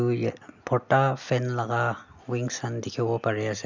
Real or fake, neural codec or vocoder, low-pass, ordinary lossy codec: real; none; 7.2 kHz; none